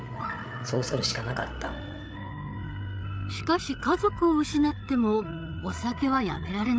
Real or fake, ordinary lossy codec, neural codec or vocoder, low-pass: fake; none; codec, 16 kHz, 8 kbps, FreqCodec, larger model; none